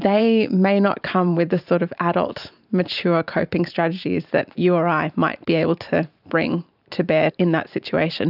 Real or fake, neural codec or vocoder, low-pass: fake; vocoder, 44.1 kHz, 128 mel bands every 512 samples, BigVGAN v2; 5.4 kHz